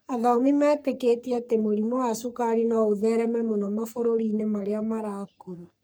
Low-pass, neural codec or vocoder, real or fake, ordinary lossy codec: none; codec, 44.1 kHz, 3.4 kbps, Pupu-Codec; fake; none